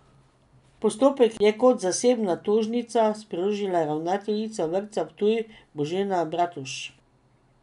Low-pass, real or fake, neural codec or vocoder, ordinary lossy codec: 10.8 kHz; real; none; none